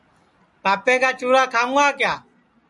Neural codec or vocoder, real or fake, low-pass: none; real; 10.8 kHz